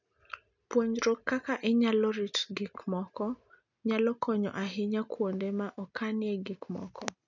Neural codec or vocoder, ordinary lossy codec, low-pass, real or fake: none; none; 7.2 kHz; real